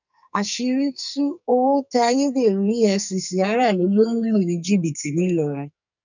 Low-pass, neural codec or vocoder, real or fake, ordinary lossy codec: 7.2 kHz; codec, 44.1 kHz, 2.6 kbps, SNAC; fake; none